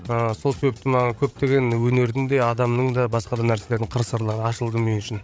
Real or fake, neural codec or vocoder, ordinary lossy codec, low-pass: fake; codec, 16 kHz, 8 kbps, FreqCodec, larger model; none; none